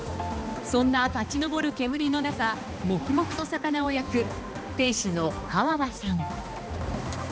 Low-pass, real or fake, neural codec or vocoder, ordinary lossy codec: none; fake; codec, 16 kHz, 2 kbps, X-Codec, HuBERT features, trained on balanced general audio; none